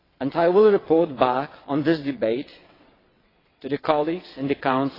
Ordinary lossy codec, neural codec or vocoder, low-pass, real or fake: AAC, 24 kbps; vocoder, 22.05 kHz, 80 mel bands, WaveNeXt; 5.4 kHz; fake